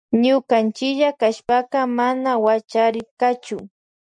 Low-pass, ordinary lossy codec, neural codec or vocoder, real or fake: 9.9 kHz; MP3, 96 kbps; none; real